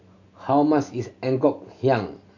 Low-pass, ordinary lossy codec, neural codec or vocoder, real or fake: 7.2 kHz; none; none; real